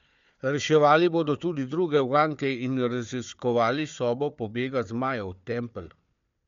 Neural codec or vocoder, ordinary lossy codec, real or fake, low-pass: codec, 16 kHz, 4 kbps, FunCodec, trained on Chinese and English, 50 frames a second; MP3, 64 kbps; fake; 7.2 kHz